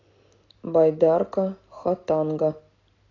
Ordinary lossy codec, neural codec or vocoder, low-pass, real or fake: AAC, 32 kbps; none; 7.2 kHz; real